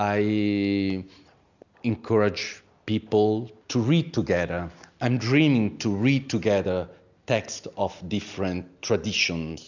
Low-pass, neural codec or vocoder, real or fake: 7.2 kHz; none; real